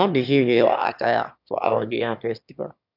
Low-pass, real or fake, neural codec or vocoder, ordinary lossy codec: 5.4 kHz; fake; autoencoder, 22.05 kHz, a latent of 192 numbers a frame, VITS, trained on one speaker; none